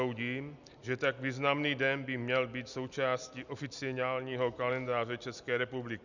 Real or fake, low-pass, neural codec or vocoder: real; 7.2 kHz; none